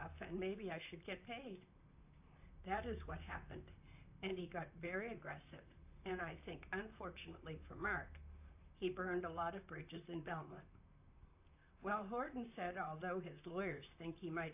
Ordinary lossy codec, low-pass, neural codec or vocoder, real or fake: AAC, 32 kbps; 3.6 kHz; vocoder, 44.1 kHz, 128 mel bands, Pupu-Vocoder; fake